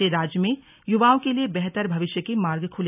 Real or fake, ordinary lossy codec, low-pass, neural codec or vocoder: real; none; 3.6 kHz; none